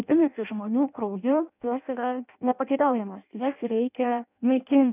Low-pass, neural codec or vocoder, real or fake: 3.6 kHz; codec, 16 kHz in and 24 kHz out, 0.6 kbps, FireRedTTS-2 codec; fake